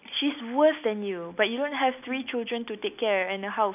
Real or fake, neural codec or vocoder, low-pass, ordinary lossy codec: real; none; 3.6 kHz; none